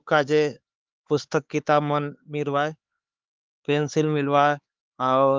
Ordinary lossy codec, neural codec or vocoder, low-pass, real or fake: Opus, 32 kbps; codec, 16 kHz, 4 kbps, X-Codec, HuBERT features, trained on LibriSpeech; 7.2 kHz; fake